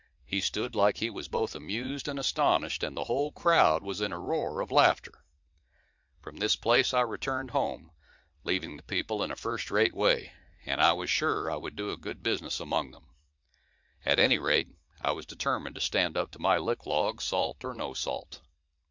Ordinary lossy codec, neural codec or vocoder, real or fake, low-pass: MP3, 64 kbps; vocoder, 44.1 kHz, 80 mel bands, Vocos; fake; 7.2 kHz